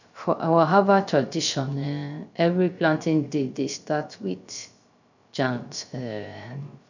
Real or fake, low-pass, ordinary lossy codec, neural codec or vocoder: fake; 7.2 kHz; none; codec, 16 kHz, 0.3 kbps, FocalCodec